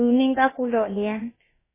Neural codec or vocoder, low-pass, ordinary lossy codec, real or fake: codec, 16 kHz, about 1 kbps, DyCAST, with the encoder's durations; 3.6 kHz; MP3, 16 kbps; fake